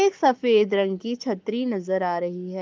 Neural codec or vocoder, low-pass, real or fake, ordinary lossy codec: codec, 44.1 kHz, 7.8 kbps, Pupu-Codec; 7.2 kHz; fake; Opus, 32 kbps